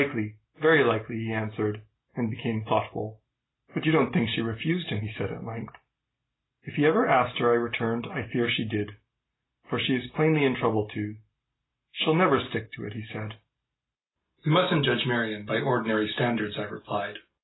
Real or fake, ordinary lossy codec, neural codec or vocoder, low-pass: real; AAC, 16 kbps; none; 7.2 kHz